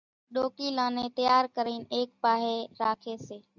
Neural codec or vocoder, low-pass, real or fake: none; 7.2 kHz; real